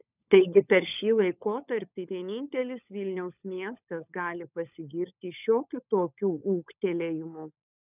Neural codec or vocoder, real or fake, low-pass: codec, 16 kHz, 16 kbps, FunCodec, trained on LibriTTS, 50 frames a second; fake; 3.6 kHz